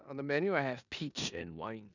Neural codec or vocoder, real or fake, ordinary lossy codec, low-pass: codec, 16 kHz in and 24 kHz out, 0.9 kbps, LongCat-Audio-Codec, four codebook decoder; fake; none; 7.2 kHz